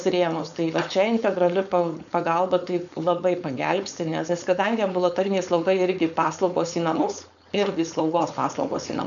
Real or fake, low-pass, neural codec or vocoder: fake; 7.2 kHz; codec, 16 kHz, 4.8 kbps, FACodec